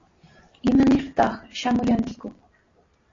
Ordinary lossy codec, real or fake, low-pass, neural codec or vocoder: AAC, 32 kbps; real; 7.2 kHz; none